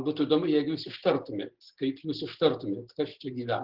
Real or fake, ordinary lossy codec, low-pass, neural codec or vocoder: real; Opus, 16 kbps; 5.4 kHz; none